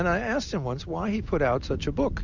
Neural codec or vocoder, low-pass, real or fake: none; 7.2 kHz; real